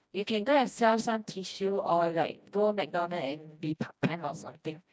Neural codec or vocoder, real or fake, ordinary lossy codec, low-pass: codec, 16 kHz, 1 kbps, FreqCodec, smaller model; fake; none; none